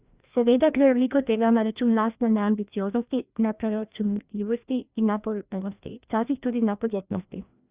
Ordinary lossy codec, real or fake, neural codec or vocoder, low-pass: Opus, 64 kbps; fake; codec, 16 kHz, 1 kbps, FreqCodec, larger model; 3.6 kHz